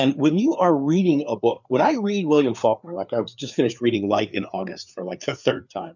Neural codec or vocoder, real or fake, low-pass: codec, 16 kHz, 4 kbps, FreqCodec, larger model; fake; 7.2 kHz